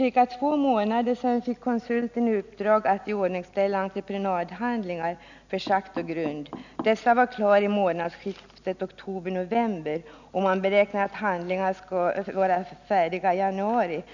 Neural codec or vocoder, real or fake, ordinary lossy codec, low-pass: none; real; none; 7.2 kHz